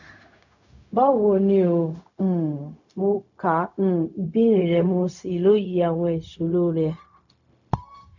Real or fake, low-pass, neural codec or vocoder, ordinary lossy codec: fake; 7.2 kHz; codec, 16 kHz, 0.4 kbps, LongCat-Audio-Codec; none